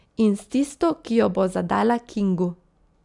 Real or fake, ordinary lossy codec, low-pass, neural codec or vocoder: real; none; 10.8 kHz; none